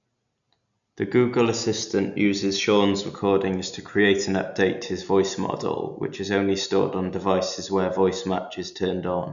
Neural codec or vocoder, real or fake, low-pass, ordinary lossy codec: none; real; 7.2 kHz; none